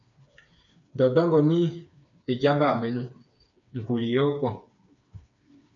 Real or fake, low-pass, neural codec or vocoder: fake; 7.2 kHz; codec, 16 kHz, 4 kbps, FreqCodec, smaller model